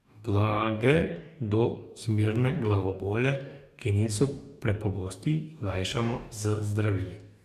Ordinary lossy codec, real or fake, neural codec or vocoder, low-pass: none; fake; codec, 44.1 kHz, 2.6 kbps, DAC; 14.4 kHz